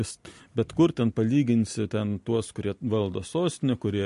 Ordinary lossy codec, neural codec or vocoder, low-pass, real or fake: MP3, 48 kbps; none; 14.4 kHz; real